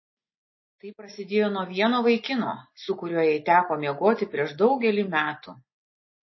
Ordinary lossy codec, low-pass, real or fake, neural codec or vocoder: MP3, 24 kbps; 7.2 kHz; real; none